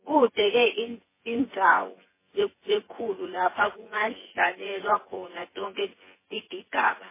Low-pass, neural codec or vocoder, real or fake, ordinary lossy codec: 3.6 kHz; vocoder, 24 kHz, 100 mel bands, Vocos; fake; MP3, 16 kbps